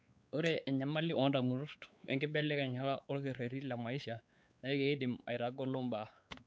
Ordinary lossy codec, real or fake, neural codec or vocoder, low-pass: none; fake; codec, 16 kHz, 4 kbps, X-Codec, WavLM features, trained on Multilingual LibriSpeech; none